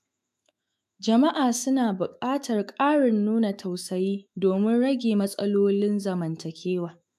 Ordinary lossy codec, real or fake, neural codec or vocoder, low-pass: AAC, 96 kbps; fake; autoencoder, 48 kHz, 128 numbers a frame, DAC-VAE, trained on Japanese speech; 14.4 kHz